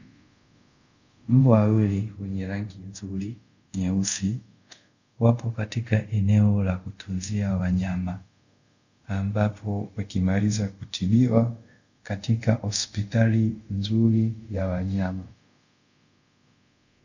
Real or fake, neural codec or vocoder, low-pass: fake; codec, 24 kHz, 0.5 kbps, DualCodec; 7.2 kHz